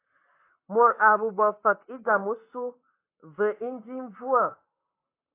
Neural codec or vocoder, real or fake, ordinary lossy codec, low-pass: vocoder, 44.1 kHz, 128 mel bands, Pupu-Vocoder; fake; AAC, 24 kbps; 3.6 kHz